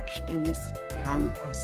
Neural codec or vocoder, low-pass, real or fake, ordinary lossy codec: codec, 32 kHz, 1.9 kbps, SNAC; 14.4 kHz; fake; Opus, 32 kbps